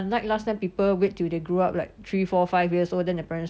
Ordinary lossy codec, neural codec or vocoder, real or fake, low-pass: none; none; real; none